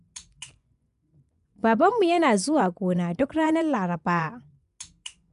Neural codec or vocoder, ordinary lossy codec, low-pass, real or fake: vocoder, 24 kHz, 100 mel bands, Vocos; none; 10.8 kHz; fake